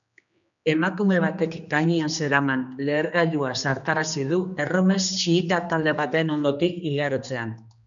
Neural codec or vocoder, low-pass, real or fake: codec, 16 kHz, 2 kbps, X-Codec, HuBERT features, trained on general audio; 7.2 kHz; fake